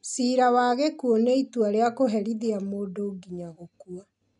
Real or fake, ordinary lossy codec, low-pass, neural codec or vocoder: real; none; 10.8 kHz; none